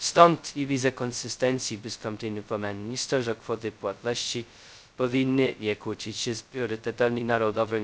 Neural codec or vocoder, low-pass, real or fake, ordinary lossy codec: codec, 16 kHz, 0.2 kbps, FocalCodec; none; fake; none